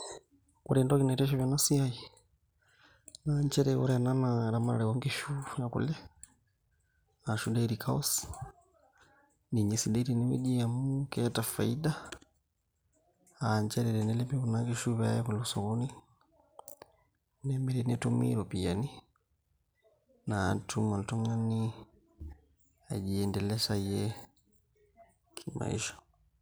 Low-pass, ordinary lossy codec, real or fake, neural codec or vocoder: none; none; real; none